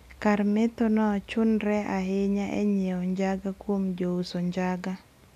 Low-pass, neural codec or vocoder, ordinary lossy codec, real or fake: 14.4 kHz; none; none; real